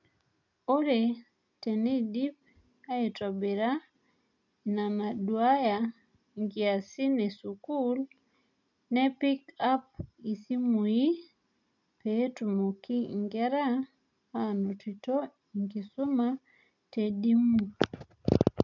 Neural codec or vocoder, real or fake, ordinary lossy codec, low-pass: none; real; none; 7.2 kHz